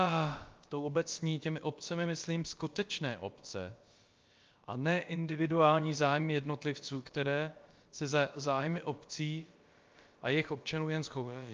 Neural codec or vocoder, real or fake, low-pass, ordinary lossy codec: codec, 16 kHz, about 1 kbps, DyCAST, with the encoder's durations; fake; 7.2 kHz; Opus, 24 kbps